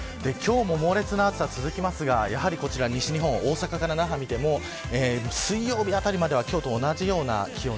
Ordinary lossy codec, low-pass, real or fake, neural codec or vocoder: none; none; real; none